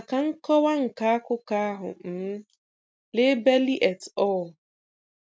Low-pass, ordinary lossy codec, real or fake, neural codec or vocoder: none; none; real; none